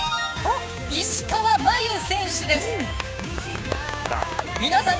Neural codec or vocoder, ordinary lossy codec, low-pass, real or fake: codec, 16 kHz, 6 kbps, DAC; none; none; fake